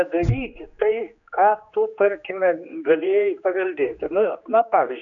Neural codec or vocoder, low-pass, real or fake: codec, 16 kHz, 2 kbps, X-Codec, HuBERT features, trained on general audio; 7.2 kHz; fake